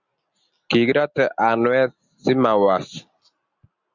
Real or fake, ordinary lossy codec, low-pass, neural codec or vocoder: real; Opus, 64 kbps; 7.2 kHz; none